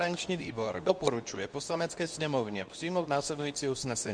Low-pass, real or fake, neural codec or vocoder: 9.9 kHz; fake; codec, 24 kHz, 0.9 kbps, WavTokenizer, medium speech release version 2